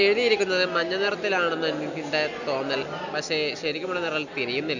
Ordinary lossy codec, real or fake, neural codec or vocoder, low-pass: none; real; none; 7.2 kHz